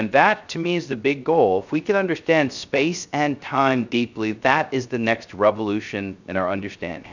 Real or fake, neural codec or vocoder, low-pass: fake; codec, 16 kHz, 0.3 kbps, FocalCodec; 7.2 kHz